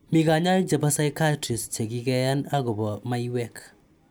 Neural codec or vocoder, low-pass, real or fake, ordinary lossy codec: none; none; real; none